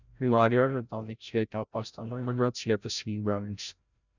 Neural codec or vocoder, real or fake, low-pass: codec, 16 kHz, 0.5 kbps, FreqCodec, larger model; fake; 7.2 kHz